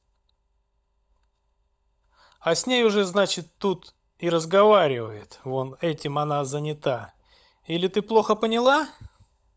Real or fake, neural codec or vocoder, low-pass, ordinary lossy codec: fake; codec, 16 kHz, 16 kbps, FunCodec, trained on LibriTTS, 50 frames a second; none; none